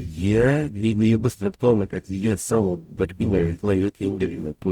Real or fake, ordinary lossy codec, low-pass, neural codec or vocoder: fake; Opus, 64 kbps; 19.8 kHz; codec, 44.1 kHz, 0.9 kbps, DAC